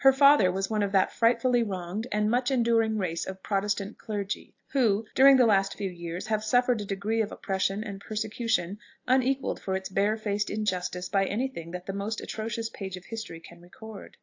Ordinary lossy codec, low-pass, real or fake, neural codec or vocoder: AAC, 48 kbps; 7.2 kHz; real; none